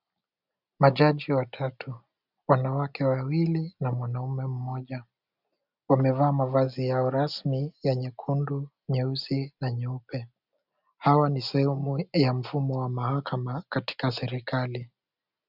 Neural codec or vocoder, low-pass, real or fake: none; 5.4 kHz; real